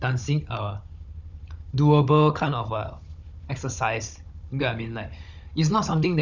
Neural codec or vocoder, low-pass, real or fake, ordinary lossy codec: codec, 16 kHz, 16 kbps, FunCodec, trained on Chinese and English, 50 frames a second; 7.2 kHz; fake; none